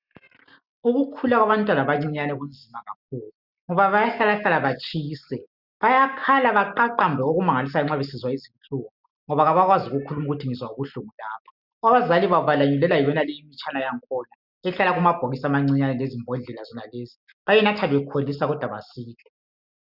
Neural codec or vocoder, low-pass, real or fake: none; 5.4 kHz; real